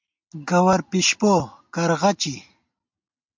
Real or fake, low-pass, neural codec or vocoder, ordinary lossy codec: real; 7.2 kHz; none; MP3, 64 kbps